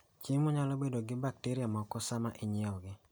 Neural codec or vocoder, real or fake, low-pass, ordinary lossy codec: none; real; none; none